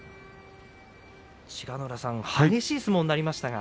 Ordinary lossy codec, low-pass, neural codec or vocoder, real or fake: none; none; none; real